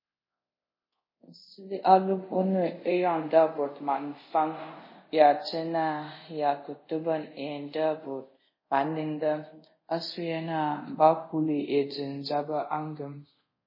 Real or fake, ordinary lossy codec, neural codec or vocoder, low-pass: fake; MP3, 24 kbps; codec, 24 kHz, 0.5 kbps, DualCodec; 5.4 kHz